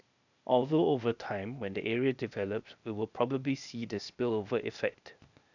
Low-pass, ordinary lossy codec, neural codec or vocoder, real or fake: 7.2 kHz; none; codec, 16 kHz, 0.8 kbps, ZipCodec; fake